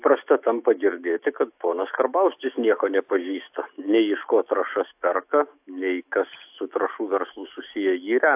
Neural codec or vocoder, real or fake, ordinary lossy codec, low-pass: autoencoder, 48 kHz, 128 numbers a frame, DAC-VAE, trained on Japanese speech; fake; AAC, 32 kbps; 3.6 kHz